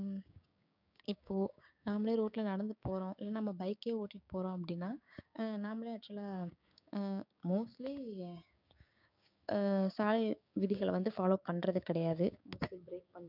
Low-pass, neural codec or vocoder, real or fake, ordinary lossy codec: 5.4 kHz; codec, 16 kHz, 6 kbps, DAC; fake; none